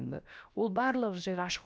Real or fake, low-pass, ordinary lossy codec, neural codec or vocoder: fake; none; none; codec, 16 kHz, about 1 kbps, DyCAST, with the encoder's durations